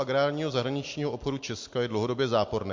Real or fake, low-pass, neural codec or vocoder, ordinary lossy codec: real; 7.2 kHz; none; MP3, 48 kbps